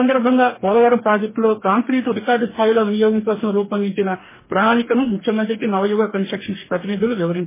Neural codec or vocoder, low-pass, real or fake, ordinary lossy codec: codec, 44.1 kHz, 2.6 kbps, DAC; 3.6 kHz; fake; MP3, 16 kbps